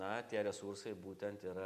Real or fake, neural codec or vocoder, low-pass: real; none; 14.4 kHz